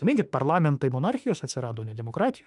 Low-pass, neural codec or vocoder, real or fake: 10.8 kHz; autoencoder, 48 kHz, 32 numbers a frame, DAC-VAE, trained on Japanese speech; fake